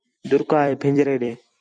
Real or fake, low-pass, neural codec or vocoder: fake; 9.9 kHz; vocoder, 44.1 kHz, 128 mel bands every 256 samples, BigVGAN v2